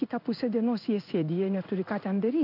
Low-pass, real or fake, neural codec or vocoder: 5.4 kHz; fake; codec, 16 kHz in and 24 kHz out, 1 kbps, XY-Tokenizer